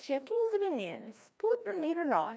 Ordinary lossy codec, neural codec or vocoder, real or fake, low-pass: none; codec, 16 kHz, 1 kbps, FreqCodec, larger model; fake; none